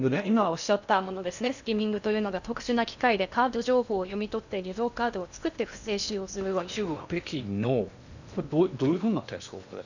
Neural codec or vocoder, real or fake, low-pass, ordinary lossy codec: codec, 16 kHz in and 24 kHz out, 0.8 kbps, FocalCodec, streaming, 65536 codes; fake; 7.2 kHz; none